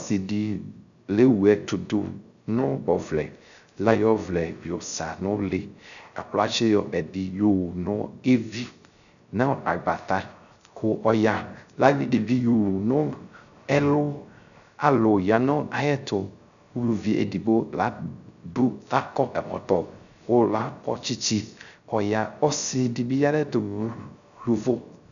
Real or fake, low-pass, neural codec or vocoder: fake; 7.2 kHz; codec, 16 kHz, 0.3 kbps, FocalCodec